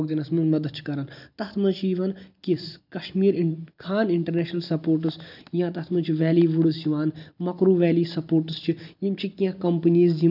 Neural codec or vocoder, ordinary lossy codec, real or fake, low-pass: none; none; real; 5.4 kHz